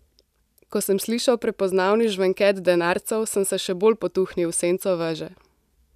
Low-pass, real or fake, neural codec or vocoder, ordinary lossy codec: 14.4 kHz; real; none; none